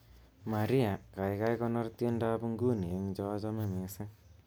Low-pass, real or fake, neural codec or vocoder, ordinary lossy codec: none; real; none; none